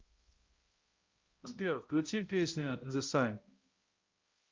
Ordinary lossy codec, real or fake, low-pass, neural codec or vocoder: Opus, 24 kbps; fake; 7.2 kHz; codec, 16 kHz, 0.5 kbps, X-Codec, HuBERT features, trained on balanced general audio